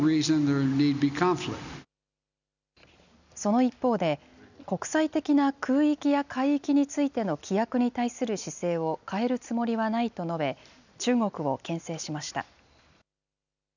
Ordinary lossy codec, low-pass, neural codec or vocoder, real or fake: none; 7.2 kHz; none; real